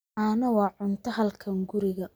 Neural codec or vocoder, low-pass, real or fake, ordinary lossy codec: none; none; real; none